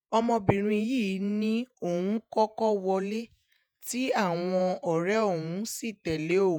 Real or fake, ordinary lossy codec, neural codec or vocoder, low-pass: fake; none; vocoder, 48 kHz, 128 mel bands, Vocos; none